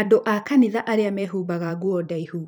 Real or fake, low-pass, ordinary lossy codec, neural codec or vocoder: fake; none; none; vocoder, 44.1 kHz, 128 mel bands every 512 samples, BigVGAN v2